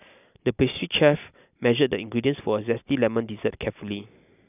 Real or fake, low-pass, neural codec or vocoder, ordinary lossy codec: real; 3.6 kHz; none; none